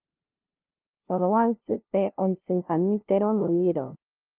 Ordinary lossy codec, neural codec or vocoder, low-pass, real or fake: Opus, 16 kbps; codec, 16 kHz, 0.5 kbps, FunCodec, trained on LibriTTS, 25 frames a second; 3.6 kHz; fake